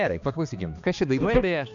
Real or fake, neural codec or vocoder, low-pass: fake; codec, 16 kHz, 2 kbps, X-Codec, HuBERT features, trained on balanced general audio; 7.2 kHz